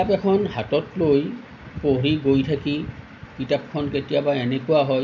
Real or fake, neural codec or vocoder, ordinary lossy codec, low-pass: real; none; none; 7.2 kHz